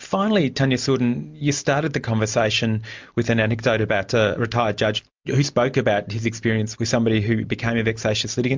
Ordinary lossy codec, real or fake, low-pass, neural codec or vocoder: MP3, 64 kbps; real; 7.2 kHz; none